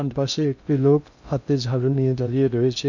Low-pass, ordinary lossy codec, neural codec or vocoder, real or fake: 7.2 kHz; none; codec, 16 kHz in and 24 kHz out, 0.6 kbps, FocalCodec, streaming, 2048 codes; fake